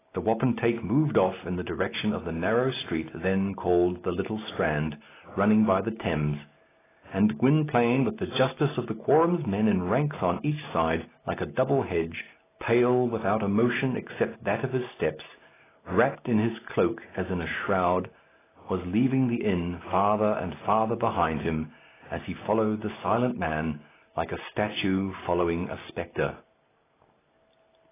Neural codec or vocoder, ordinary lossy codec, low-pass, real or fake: none; AAC, 16 kbps; 3.6 kHz; real